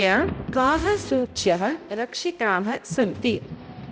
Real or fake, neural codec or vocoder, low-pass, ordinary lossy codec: fake; codec, 16 kHz, 0.5 kbps, X-Codec, HuBERT features, trained on balanced general audio; none; none